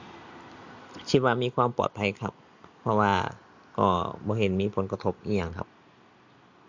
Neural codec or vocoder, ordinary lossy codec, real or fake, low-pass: none; MP3, 48 kbps; real; 7.2 kHz